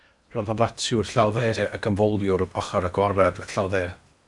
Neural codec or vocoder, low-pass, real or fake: codec, 16 kHz in and 24 kHz out, 0.8 kbps, FocalCodec, streaming, 65536 codes; 10.8 kHz; fake